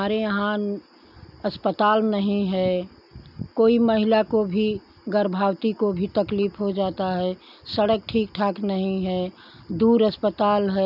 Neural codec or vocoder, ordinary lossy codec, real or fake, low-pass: none; none; real; 5.4 kHz